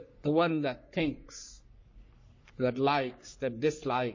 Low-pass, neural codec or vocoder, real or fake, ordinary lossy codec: 7.2 kHz; codec, 44.1 kHz, 3.4 kbps, Pupu-Codec; fake; MP3, 32 kbps